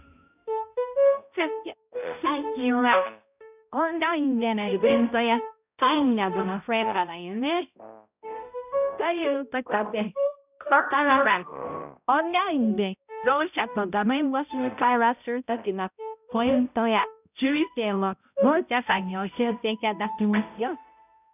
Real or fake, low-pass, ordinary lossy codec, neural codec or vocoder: fake; 3.6 kHz; none; codec, 16 kHz, 0.5 kbps, X-Codec, HuBERT features, trained on balanced general audio